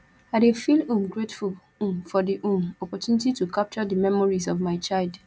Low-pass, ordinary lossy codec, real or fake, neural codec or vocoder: none; none; real; none